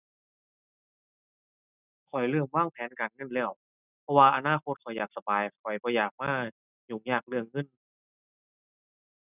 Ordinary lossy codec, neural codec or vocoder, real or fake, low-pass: none; none; real; 3.6 kHz